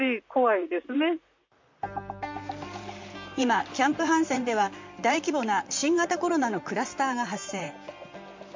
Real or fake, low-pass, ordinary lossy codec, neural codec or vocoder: fake; 7.2 kHz; MP3, 64 kbps; vocoder, 44.1 kHz, 128 mel bands, Pupu-Vocoder